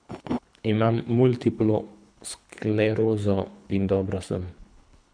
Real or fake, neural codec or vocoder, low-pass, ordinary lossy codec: fake; codec, 24 kHz, 3 kbps, HILCodec; 9.9 kHz; none